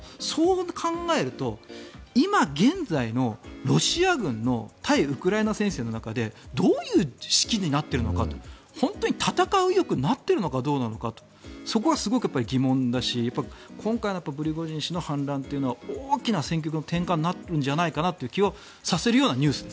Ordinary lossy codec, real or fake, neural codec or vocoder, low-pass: none; real; none; none